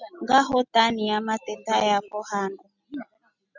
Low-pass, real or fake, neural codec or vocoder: 7.2 kHz; real; none